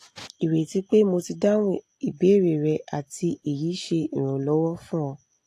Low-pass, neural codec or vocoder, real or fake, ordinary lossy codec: 14.4 kHz; none; real; AAC, 48 kbps